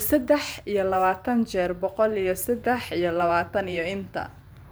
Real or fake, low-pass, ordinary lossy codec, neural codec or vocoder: fake; none; none; vocoder, 44.1 kHz, 128 mel bands every 512 samples, BigVGAN v2